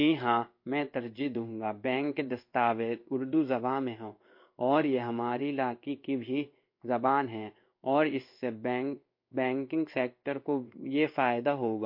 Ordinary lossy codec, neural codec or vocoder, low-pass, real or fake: MP3, 32 kbps; codec, 16 kHz in and 24 kHz out, 1 kbps, XY-Tokenizer; 5.4 kHz; fake